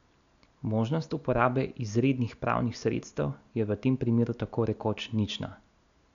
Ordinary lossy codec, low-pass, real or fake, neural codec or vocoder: none; 7.2 kHz; real; none